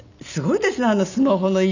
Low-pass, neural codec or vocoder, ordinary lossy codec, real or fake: 7.2 kHz; none; none; real